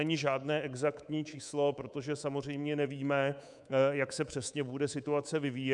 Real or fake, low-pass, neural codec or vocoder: fake; 10.8 kHz; codec, 24 kHz, 3.1 kbps, DualCodec